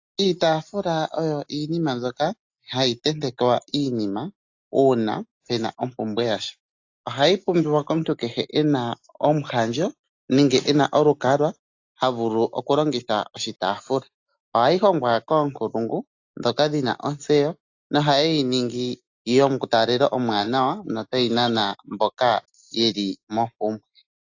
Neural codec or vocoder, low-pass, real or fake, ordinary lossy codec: none; 7.2 kHz; real; AAC, 48 kbps